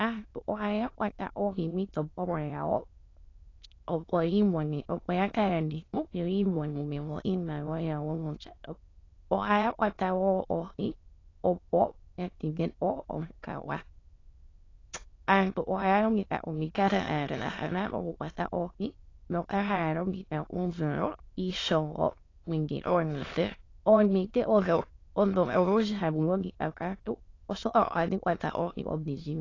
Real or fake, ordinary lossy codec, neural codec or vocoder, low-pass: fake; AAC, 32 kbps; autoencoder, 22.05 kHz, a latent of 192 numbers a frame, VITS, trained on many speakers; 7.2 kHz